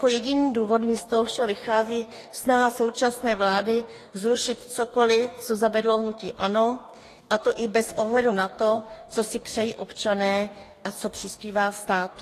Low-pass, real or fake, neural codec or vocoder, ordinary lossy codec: 14.4 kHz; fake; codec, 44.1 kHz, 2.6 kbps, DAC; AAC, 48 kbps